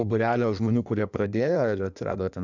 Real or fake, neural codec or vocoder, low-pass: fake; codec, 16 kHz in and 24 kHz out, 1.1 kbps, FireRedTTS-2 codec; 7.2 kHz